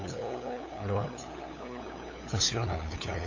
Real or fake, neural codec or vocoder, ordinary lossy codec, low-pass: fake; codec, 16 kHz, 8 kbps, FunCodec, trained on LibriTTS, 25 frames a second; AAC, 48 kbps; 7.2 kHz